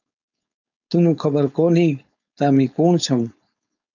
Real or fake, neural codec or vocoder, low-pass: fake; codec, 16 kHz, 4.8 kbps, FACodec; 7.2 kHz